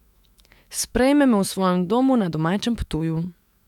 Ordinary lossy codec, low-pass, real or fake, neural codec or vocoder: none; 19.8 kHz; fake; autoencoder, 48 kHz, 128 numbers a frame, DAC-VAE, trained on Japanese speech